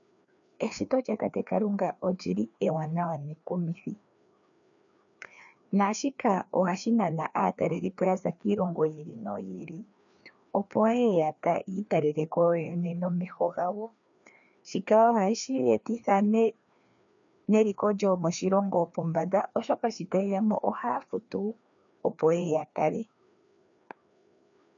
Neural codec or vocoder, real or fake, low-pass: codec, 16 kHz, 2 kbps, FreqCodec, larger model; fake; 7.2 kHz